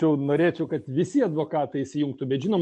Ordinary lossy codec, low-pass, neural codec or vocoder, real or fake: MP3, 48 kbps; 10.8 kHz; none; real